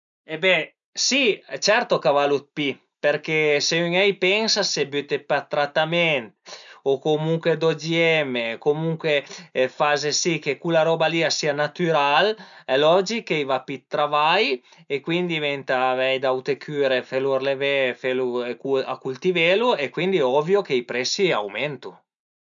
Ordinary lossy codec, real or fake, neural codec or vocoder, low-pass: none; real; none; 7.2 kHz